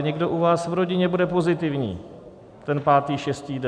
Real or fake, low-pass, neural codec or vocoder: real; 9.9 kHz; none